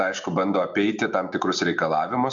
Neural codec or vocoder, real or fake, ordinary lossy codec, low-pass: none; real; MP3, 64 kbps; 7.2 kHz